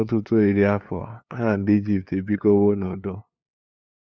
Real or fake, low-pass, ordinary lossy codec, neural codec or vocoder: fake; none; none; codec, 16 kHz, 2 kbps, FunCodec, trained on LibriTTS, 25 frames a second